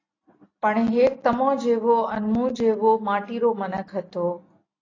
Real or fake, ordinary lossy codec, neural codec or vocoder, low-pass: real; AAC, 32 kbps; none; 7.2 kHz